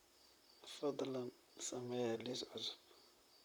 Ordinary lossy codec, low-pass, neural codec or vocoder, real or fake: none; none; vocoder, 44.1 kHz, 128 mel bands, Pupu-Vocoder; fake